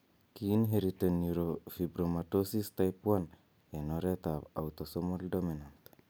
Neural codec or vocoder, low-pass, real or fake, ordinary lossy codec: none; none; real; none